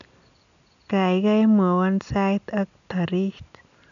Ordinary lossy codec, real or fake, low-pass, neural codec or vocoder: none; real; 7.2 kHz; none